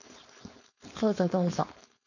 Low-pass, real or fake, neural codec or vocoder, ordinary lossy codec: 7.2 kHz; fake; codec, 16 kHz, 4.8 kbps, FACodec; none